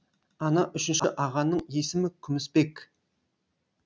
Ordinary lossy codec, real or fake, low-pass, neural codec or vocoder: none; real; none; none